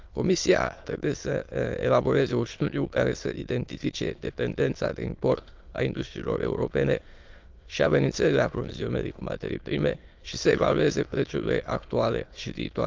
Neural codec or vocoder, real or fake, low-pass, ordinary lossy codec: autoencoder, 22.05 kHz, a latent of 192 numbers a frame, VITS, trained on many speakers; fake; 7.2 kHz; Opus, 32 kbps